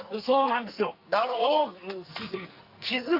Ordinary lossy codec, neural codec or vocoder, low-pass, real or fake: Opus, 64 kbps; codec, 44.1 kHz, 2.6 kbps, SNAC; 5.4 kHz; fake